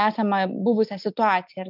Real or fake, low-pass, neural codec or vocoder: real; 5.4 kHz; none